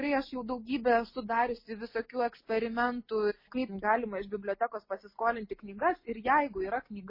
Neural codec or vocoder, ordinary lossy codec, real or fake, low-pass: none; MP3, 24 kbps; real; 5.4 kHz